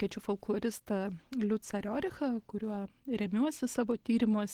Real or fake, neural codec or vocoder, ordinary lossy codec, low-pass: real; none; Opus, 16 kbps; 19.8 kHz